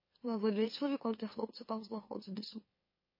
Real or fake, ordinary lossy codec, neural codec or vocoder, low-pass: fake; MP3, 24 kbps; autoencoder, 44.1 kHz, a latent of 192 numbers a frame, MeloTTS; 5.4 kHz